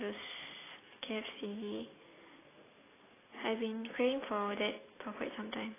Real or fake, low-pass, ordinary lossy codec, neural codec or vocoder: real; 3.6 kHz; AAC, 16 kbps; none